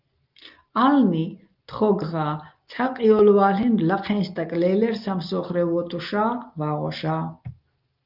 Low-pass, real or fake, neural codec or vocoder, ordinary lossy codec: 5.4 kHz; real; none; Opus, 24 kbps